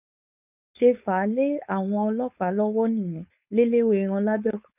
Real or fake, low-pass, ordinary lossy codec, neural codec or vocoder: fake; 3.6 kHz; none; codec, 16 kHz, 4.8 kbps, FACodec